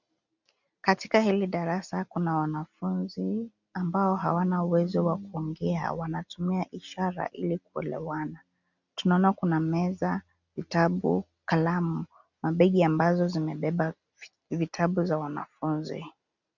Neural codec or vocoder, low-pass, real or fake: none; 7.2 kHz; real